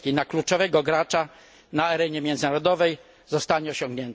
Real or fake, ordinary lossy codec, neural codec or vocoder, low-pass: real; none; none; none